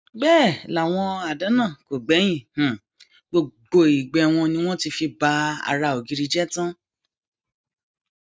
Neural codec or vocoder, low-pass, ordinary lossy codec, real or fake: none; none; none; real